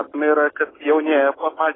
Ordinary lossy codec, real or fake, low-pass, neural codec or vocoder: AAC, 16 kbps; real; 7.2 kHz; none